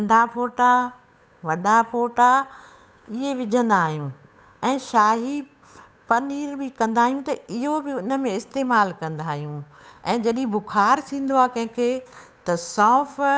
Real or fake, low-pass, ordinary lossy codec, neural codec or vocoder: fake; none; none; codec, 16 kHz, 8 kbps, FunCodec, trained on Chinese and English, 25 frames a second